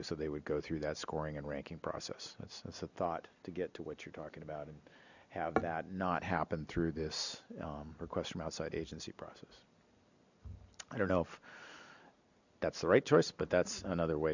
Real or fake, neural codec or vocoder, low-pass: real; none; 7.2 kHz